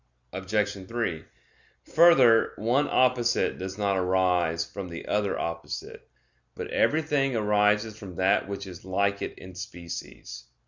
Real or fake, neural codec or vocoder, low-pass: real; none; 7.2 kHz